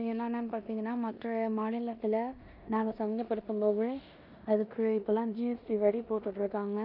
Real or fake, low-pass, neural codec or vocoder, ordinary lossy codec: fake; 5.4 kHz; codec, 16 kHz in and 24 kHz out, 0.9 kbps, LongCat-Audio-Codec, four codebook decoder; none